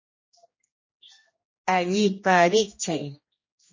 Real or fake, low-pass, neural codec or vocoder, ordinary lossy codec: fake; 7.2 kHz; codec, 16 kHz, 1 kbps, X-Codec, HuBERT features, trained on general audio; MP3, 32 kbps